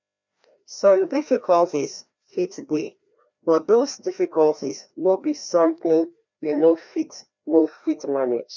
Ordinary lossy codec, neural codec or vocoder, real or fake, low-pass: MP3, 64 kbps; codec, 16 kHz, 1 kbps, FreqCodec, larger model; fake; 7.2 kHz